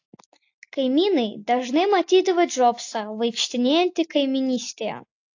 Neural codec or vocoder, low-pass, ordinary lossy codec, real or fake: none; 7.2 kHz; AAC, 48 kbps; real